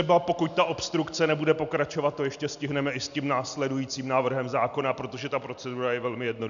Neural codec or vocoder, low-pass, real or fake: none; 7.2 kHz; real